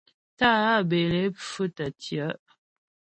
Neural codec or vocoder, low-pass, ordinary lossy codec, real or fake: none; 9.9 kHz; MP3, 32 kbps; real